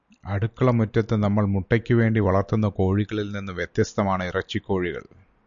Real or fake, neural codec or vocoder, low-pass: real; none; 7.2 kHz